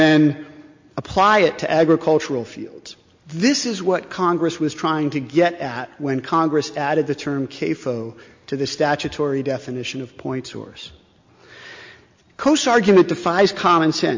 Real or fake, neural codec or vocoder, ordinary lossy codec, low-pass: real; none; MP3, 48 kbps; 7.2 kHz